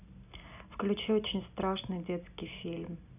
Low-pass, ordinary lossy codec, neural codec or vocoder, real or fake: 3.6 kHz; none; none; real